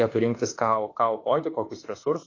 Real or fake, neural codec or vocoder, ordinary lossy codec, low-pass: fake; autoencoder, 48 kHz, 32 numbers a frame, DAC-VAE, trained on Japanese speech; AAC, 32 kbps; 7.2 kHz